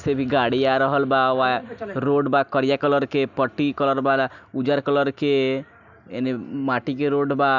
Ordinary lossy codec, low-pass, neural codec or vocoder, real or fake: none; 7.2 kHz; none; real